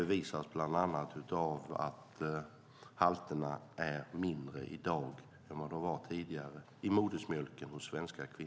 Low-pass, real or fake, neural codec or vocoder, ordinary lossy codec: none; real; none; none